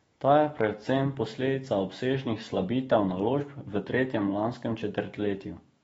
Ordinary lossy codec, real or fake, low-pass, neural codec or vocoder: AAC, 24 kbps; fake; 19.8 kHz; autoencoder, 48 kHz, 128 numbers a frame, DAC-VAE, trained on Japanese speech